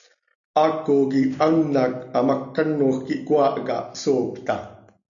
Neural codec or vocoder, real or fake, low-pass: none; real; 7.2 kHz